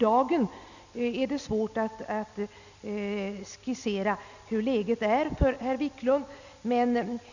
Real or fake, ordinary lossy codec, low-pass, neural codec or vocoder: real; none; 7.2 kHz; none